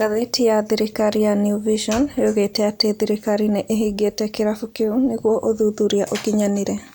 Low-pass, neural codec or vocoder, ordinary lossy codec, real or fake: none; none; none; real